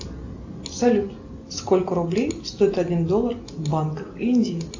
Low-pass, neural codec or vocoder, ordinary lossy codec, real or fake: 7.2 kHz; none; AAC, 48 kbps; real